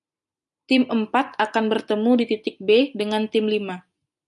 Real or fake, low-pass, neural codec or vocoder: real; 10.8 kHz; none